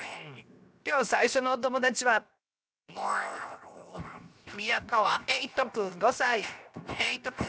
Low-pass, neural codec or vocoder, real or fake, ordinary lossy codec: none; codec, 16 kHz, 0.7 kbps, FocalCodec; fake; none